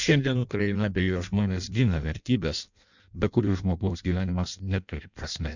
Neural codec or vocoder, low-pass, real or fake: codec, 16 kHz in and 24 kHz out, 0.6 kbps, FireRedTTS-2 codec; 7.2 kHz; fake